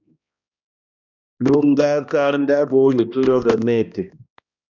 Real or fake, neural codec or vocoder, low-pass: fake; codec, 16 kHz, 1 kbps, X-Codec, HuBERT features, trained on balanced general audio; 7.2 kHz